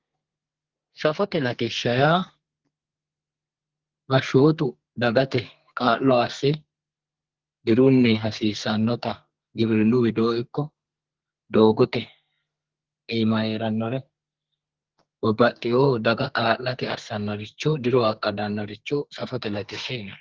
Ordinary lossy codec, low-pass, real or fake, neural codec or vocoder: Opus, 16 kbps; 7.2 kHz; fake; codec, 32 kHz, 1.9 kbps, SNAC